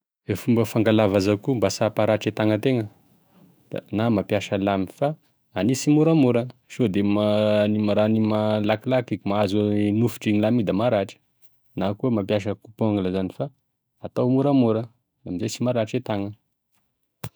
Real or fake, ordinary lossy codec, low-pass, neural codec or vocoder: fake; none; none; autoencoder, 48 kHz, 128 numbers a frame, DAC-VAE, trained on Japanese speech